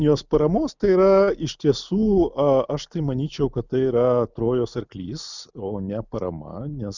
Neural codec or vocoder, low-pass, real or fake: none; 7.2 kHz; real